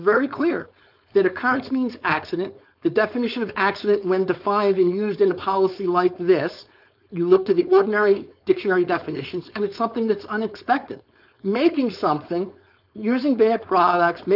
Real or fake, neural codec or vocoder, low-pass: fake; codec, 16 kHz, 4.8 kbps, FACodec; 5.4 kHz